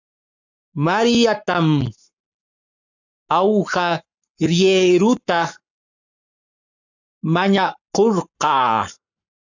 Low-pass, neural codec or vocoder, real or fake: 7.2 kHz; codec, 44.1 kHz, 7.8 kbps, Pupu-Codec; fake